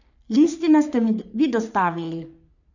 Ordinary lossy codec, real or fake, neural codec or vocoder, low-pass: none; fake; codec, 44.1 kHz, 3.4 kbps, Pupu-Codec; 7.2 kHz